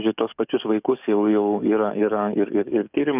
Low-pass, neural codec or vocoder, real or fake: 3.6 kHz; codec, 44.1 kHz, 7.8 kbps, DAC; fake